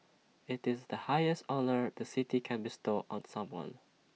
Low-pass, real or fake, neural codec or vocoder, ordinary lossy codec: none; real; none; none